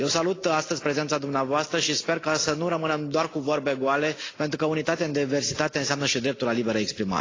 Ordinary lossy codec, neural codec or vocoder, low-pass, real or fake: AAC, 32 kbps; none; 7.2 kHz; real